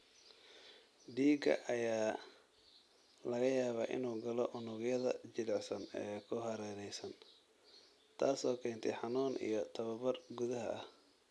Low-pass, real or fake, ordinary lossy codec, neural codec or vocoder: none; real; none; none